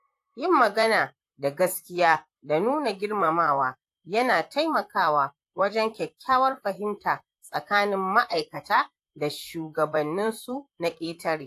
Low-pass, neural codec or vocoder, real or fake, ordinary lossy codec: 14.4 kHz; vocoder, 44.1 kHz, 128 mel bands, Pupu-Vocoder; fake; AAC, 64 kbps